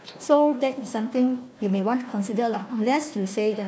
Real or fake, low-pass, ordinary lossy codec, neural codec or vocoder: fake; none; none; codec, 16 kHz, 1 kbps, FunCodec, trained on Chinese and English, 50 frames a second